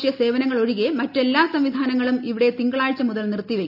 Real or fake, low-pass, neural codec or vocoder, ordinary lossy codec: real; 5.4 kHz; none; none